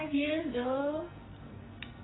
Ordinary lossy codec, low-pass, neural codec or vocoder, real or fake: AAC, 16 kbps; 7.2 kHz; codec, 44.1 kHz, 2.6 kbps, SNAC; fake